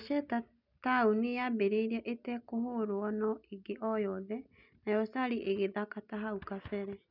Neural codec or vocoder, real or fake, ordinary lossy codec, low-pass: none; real; none; 5.4 kHz